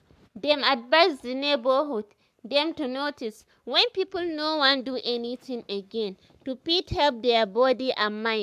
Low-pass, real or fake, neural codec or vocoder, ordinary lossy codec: 14.4 kHz; fake; codec, 44.1 kHz, 7.8 kbps, Pupu-Codec; AAC, 96 kbps